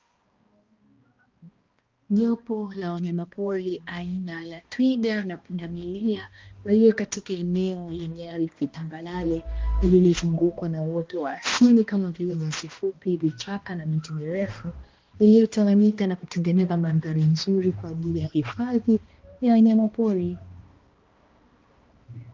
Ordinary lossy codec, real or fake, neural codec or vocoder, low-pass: Opus, 24 kbps; fake; codec, 16 kHz, 1 kbps, X-Codec, HuBERT features, trained on balanced general audio; 7.2 kHz